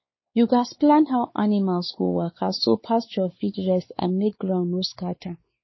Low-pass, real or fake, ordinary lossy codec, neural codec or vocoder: 7.2 kHz; fake; MP3, 24 kbps; codec, 16 kHz, 4 kbps, X-Codec, WavLM features, trained on Multilingual LibriSpeech